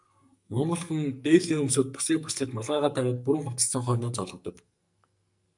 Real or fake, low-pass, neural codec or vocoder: fake; 10.8 kHz; codec, 44.1 kHz, 2.6 kbps, SNAC